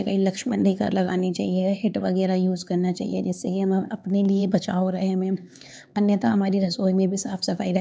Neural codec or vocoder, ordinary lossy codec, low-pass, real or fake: codec, 16 kHz, 4 kbps, X-Codec, HuBERT features, trained on LibriSpeech; none; none; fake